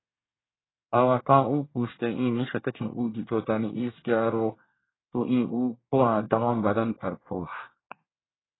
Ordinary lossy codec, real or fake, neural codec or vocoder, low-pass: AAC, 16 kbps; fake; codec, 24 kHz, 1 kbps, SNAC; 7.2 kHz